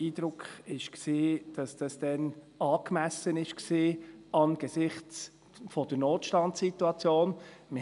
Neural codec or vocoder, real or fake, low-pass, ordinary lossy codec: none; real; 10.8 kHz; none